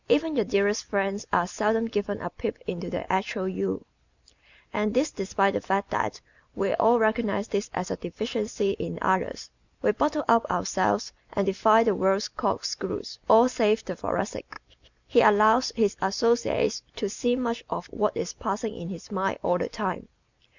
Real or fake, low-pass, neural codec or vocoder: real; 7.2 kHz; none